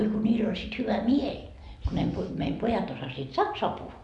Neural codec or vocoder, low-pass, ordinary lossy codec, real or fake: none; 10.8 kHz; none; real